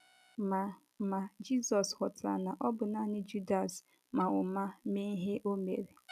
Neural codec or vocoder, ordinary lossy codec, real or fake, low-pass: none; none; real; 14.4 kHz